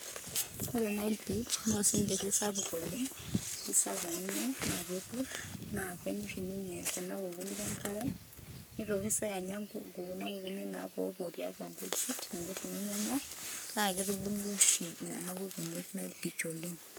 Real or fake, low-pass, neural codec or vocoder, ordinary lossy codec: fake; none; codec, 44.1 kHz, 3.4 kbps, Pupu-Codec; none